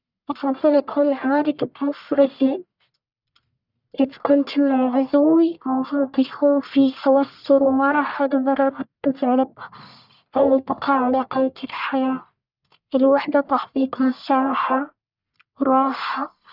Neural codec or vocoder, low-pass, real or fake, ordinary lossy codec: codec, 44.1 kHz, 1.7 kbps, Pupu-Codec; 5.4 kHz; fake; none